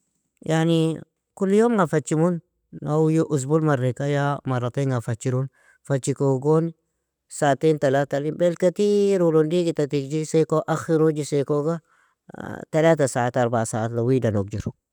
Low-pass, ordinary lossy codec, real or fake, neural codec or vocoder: 19.8 kHz; none; real; none